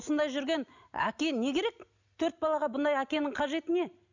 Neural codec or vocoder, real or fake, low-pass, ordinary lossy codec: none; real; 7.2 kHz; none